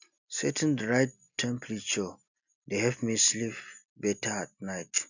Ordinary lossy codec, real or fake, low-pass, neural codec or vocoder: none; real; 7.2 kHz; none